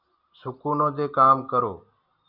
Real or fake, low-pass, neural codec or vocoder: real; 5.4 kHz; none